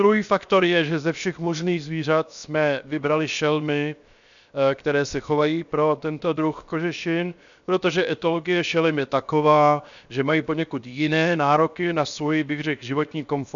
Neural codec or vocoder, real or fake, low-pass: codec, 16 kHz, 0.7 kbps, FocalCodec; fake; 7.2 kHz